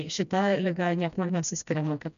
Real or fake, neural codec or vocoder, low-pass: fake; codec, 16 kHz, 1 kbps, FreqCodec, smaller model; 7.2 kHz